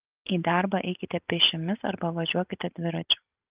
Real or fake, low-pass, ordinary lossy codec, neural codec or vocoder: real; 3.6 kHz; Opus, 32 kbps; none